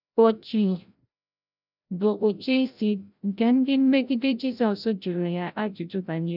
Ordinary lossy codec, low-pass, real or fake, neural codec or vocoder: none; 5.4 kHz; fake; codec, 16 kHz, 0.5 kbps, FreqCodec, larger model